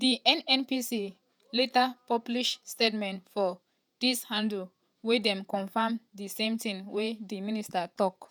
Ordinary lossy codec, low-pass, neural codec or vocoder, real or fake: none; none; vocoder, 48 kHz, 128 mel bands, Vocos; fake